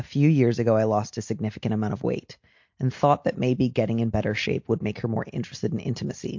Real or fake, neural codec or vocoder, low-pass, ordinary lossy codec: real; none; 7.2 kHz; MP3, 48 kbps